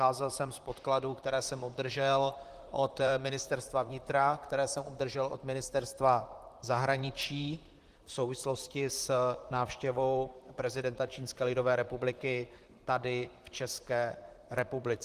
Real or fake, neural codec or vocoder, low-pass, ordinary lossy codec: fake; vocoder, 44.1 kHz, 128 mel bands, Pupu-Vocoder; 14.4 kHz; Opus, 24 kbps